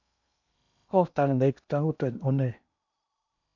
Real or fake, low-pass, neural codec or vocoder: fake; 7.2 kHz; codec, 16 kHz in and 24 kHz out, 0.8 kbps, FocalCodec, streaming, 65536 codes